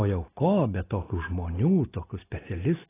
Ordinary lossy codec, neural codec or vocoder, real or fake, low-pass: AAC, 16 kbps; none; real; 3.6 kHz